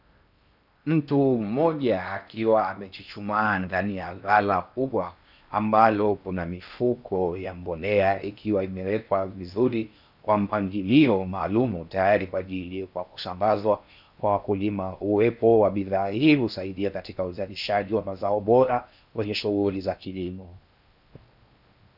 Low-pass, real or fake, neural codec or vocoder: 5.4 kHz; fake; codec, 16 kHz in and 24 kHz out, 0.6 kbps, FocalCodec, streaming, 4096 codes